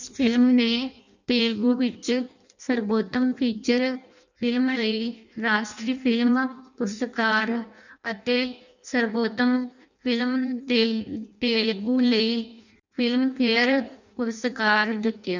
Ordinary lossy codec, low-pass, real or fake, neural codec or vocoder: none; 7.2 kHz; fake; codec, 16 kHz in and 24 kHz out, 0.6 kbps, FireRedTTS-2 codec